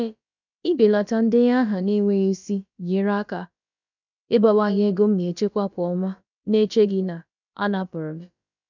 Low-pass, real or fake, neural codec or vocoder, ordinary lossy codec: 7.2 kHz; fake; codec, 16 kHz, about 1 kbps, DyCAST, with the encoder's durations; none